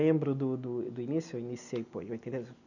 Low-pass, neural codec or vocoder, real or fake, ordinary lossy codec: 7.2 kHz; none; real; none